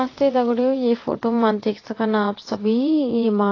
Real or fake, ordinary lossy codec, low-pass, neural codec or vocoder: fake; AAC, 32 kbps; 7.2 kHz; vocoder, 22.05 kHz, 80 mel bands, WaveNeXt